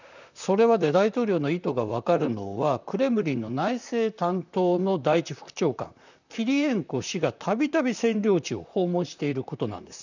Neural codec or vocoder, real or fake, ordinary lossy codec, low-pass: vocoder, 44.1 kHz, 128 mel bands, Pupu-Vocoder; fake; none; 7.2 kHz